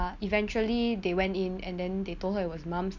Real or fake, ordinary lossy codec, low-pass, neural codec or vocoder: real; none; 7.2 kHz; none